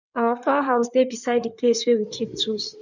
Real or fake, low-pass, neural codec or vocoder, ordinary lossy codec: fake; 7.2 kHz; codec, 16 kHz in and 24 kHz out, 2.2 kbps, FireRedTTS-2 codec; none